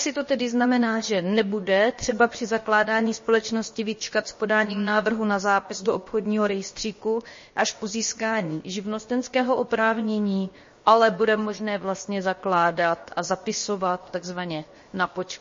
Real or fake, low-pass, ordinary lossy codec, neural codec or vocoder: fake; 7.2 kHz; MP3, 32 kbps; codec, 16 kHz, 0.7 kbps, FocalCodec